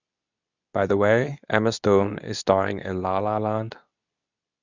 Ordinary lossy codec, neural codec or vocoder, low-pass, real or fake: none; codec, 24 kHz, 0.9 kbps, WavTokenizer, medium speech release version 2; 7.2 kHz; fake